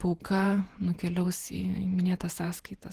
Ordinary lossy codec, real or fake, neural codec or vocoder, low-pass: Opus, 16 kbps; fake; vocoder, 48 kHz, 128 mel bands, Vocos; 14.4 kHz